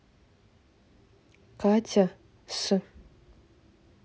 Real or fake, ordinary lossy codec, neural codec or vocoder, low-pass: real; none; none; none